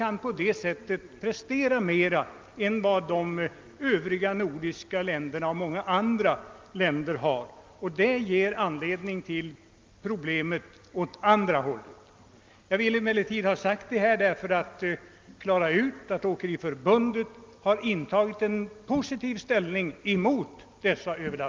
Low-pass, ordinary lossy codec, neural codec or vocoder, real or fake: 7.2 kHz; Opus, 32 kbps; none; real